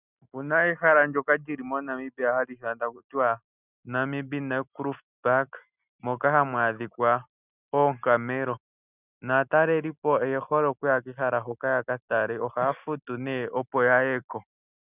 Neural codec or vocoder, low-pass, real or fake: none; 3.6 kHz; real